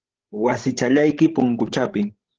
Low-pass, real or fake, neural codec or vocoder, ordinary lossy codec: 7.2 kHz; fake; codec, 16 kHz, 16 kbps, FreqCodec, larger model; Opus, 16 kbps